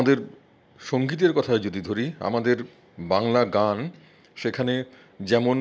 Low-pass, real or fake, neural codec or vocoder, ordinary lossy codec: none; real; none; none